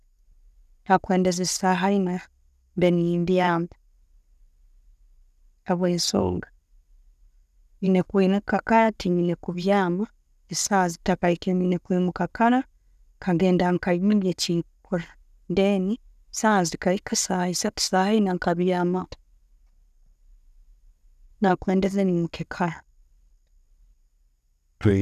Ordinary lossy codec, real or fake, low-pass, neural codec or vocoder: none; real; 14.4 kHz; none